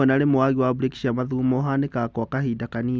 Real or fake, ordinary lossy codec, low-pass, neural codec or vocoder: real; none; none; none